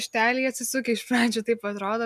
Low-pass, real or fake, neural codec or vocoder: 14.4 kHz; real; none